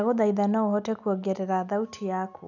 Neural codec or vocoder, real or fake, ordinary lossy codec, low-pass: none; real; none; 7.2 kHz